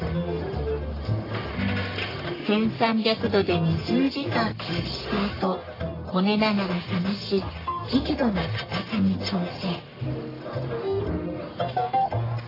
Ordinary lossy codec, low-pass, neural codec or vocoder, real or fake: AAC, 24 kbps; 5.4 kHz; codec, 44.1 kHz, 1.7 kbps, Pupu-Codec; fake